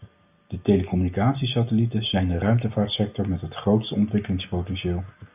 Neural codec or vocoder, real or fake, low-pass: none; real; 3.6 kHz